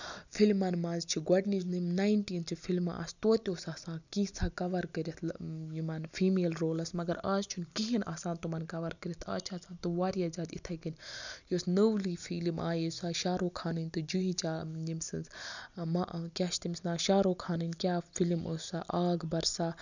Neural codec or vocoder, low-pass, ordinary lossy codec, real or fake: none; 7.2 kHz; none; real